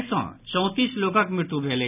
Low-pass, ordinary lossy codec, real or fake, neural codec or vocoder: 3.6 kHz; none; real; none